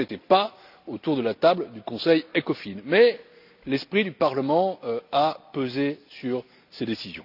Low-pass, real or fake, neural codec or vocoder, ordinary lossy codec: 5.4 kHz; real; none; none